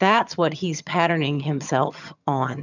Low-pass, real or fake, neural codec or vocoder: 7.2 kHz; fake; vocoder, 22.05 kHz, 80 mel bands, HiFi-GAN